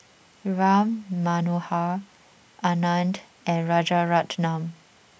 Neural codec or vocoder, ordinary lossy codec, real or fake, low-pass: none; none; real; none